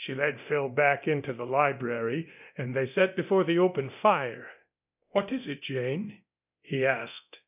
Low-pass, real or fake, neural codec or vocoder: 3.6 kHz; fake; codec, 24 kHz, 0.9 kbps, DualCodec